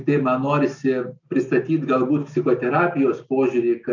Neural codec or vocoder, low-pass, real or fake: none; 7.2 kHz; real